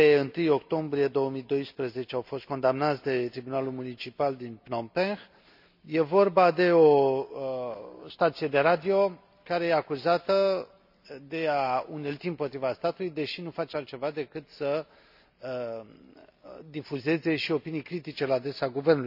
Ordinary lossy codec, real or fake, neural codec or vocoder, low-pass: none; real; none; 5.4 kHz